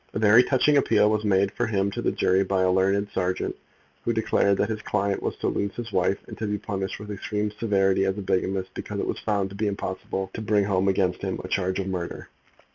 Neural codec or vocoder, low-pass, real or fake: none; 7.2 kHz; real